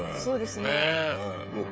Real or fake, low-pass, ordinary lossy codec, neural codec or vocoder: fake; none; none; codec, 16 kHz, 16 kbps, FreqCodec, smaller model